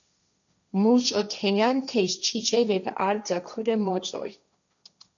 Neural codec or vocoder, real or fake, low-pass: codec, 16 kHz, 1.1 kbps, Voila-Tokenizer; fake; 7.2 kHz